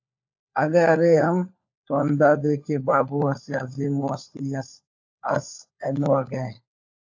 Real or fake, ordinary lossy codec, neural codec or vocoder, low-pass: fake; AAC, 48 kbps; codec, 16 kHz, 4 kbps, FunCodec, trained on LibriTTS, 50 frames a second; 7.2 kHz